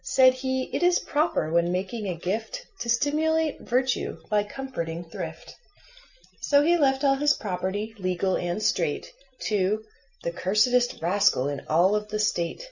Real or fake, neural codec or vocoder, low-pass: real; none; 7.2 kHz